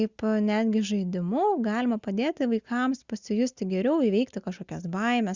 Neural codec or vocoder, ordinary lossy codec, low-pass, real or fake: none; Opus, 64 kbps; 7.2 kHz; real